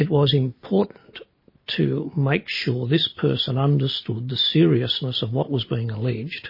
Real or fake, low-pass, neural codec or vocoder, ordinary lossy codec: real; 5.4 kHz; none; MP3, 24 kbps